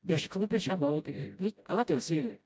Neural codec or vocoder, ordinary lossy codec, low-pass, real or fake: codec, 16 kHz, 0.5 kbps, FreqCodec, smaller model; none; none; fake